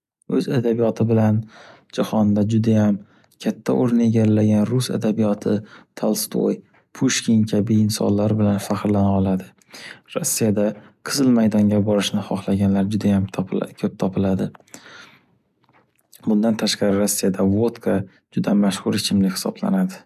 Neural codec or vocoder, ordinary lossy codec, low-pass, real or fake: none; none; 14.4 kHz; real